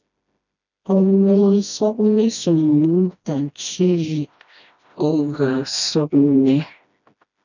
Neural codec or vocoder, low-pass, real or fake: codec, 16 kHz, 1 kbps, FreqCodec, smaller model; 7.2 kHz; fake